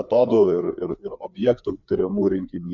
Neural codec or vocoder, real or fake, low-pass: codec, 16 kHz, 4 kbps, FreqCodec, larger model; fake; 7.2 kHz